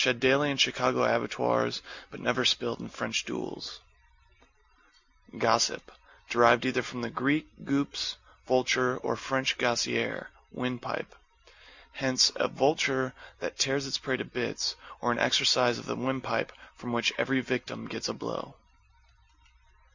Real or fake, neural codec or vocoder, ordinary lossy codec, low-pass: real; none; Opus, 64 kbps; 7.2 kHz